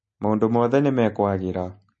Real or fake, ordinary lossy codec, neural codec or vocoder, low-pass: real; MP3, 32 kbps; none; 10.8 kHz